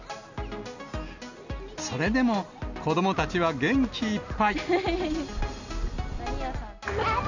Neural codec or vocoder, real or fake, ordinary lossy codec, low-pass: none; real; none; 7.2 kHz